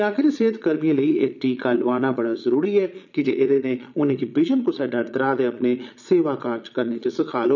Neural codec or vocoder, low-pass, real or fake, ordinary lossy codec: vocoder, 22.05 kHz, 80 mel bands, Vocos; 7.2 kHz; fake; none